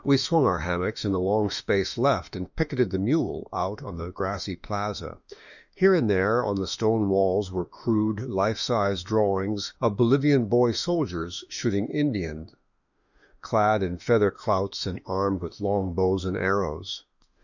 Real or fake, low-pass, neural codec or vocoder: fake; 7.2 kHz; autoencoder, 48 kHz, 32 numbers a frame, DAC-VAE, trained on Japanese speech